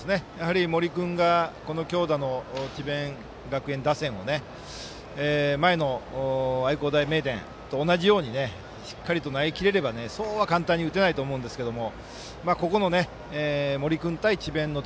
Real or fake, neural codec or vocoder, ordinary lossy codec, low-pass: real; none; none; none